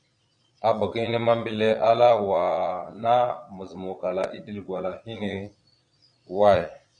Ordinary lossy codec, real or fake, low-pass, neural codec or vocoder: Opus, 64 kbps; fake; 9.9 kHz; vocoder, 22.05 kHz, 80 mel bands, Vocos